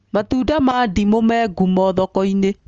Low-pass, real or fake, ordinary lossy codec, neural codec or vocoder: 7.2 kHz; real; Opus, 24 kbps; none